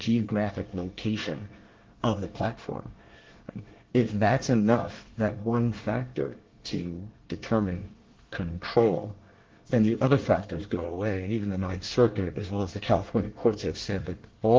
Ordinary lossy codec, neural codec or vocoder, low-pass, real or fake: Opus, 16 kbps; codec, 24 kHz, 1 kbps, SNAC; 7.2 kHz; fake